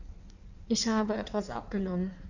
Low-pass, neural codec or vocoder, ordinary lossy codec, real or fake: 7.2 kHz; codec, 16 kHz in and 24 kHz out, 1.1 kbps, FireRedTTS-2 codec; none; fake